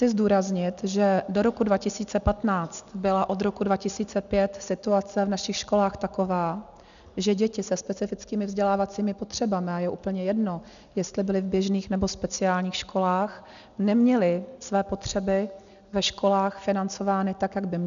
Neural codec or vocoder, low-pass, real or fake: none; 7.2 kHz; real